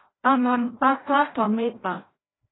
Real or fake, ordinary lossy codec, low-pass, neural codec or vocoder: fake; AAC, 16 kbps; 7.2 kHz; codec, 16 kHz, 0.5 kbps, FreqCodec, larger model